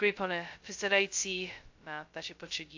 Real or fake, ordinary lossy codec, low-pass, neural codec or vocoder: fake; AAC, 48 kbps; 7.2 kHz; codec, 16 kHz, 0.2 kbps, FocalCodec